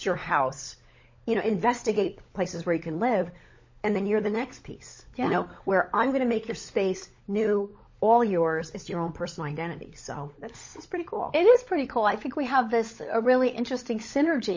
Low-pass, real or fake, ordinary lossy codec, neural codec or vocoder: 7.2 kHz; fake; MP3, 32 kbps; codec, 16 kHz, 16 kbps, FunCodec, trained on LibriTTS, 50 frames a second